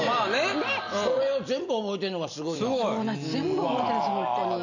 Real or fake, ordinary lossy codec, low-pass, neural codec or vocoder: real; none; 7.2 kHz; none